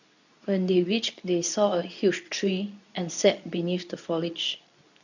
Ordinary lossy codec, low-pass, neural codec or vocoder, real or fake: none; 7.2 kHz; codec, 24 kHz, 0.9 kbps, WavTokenizer, medium speech release version 2; fake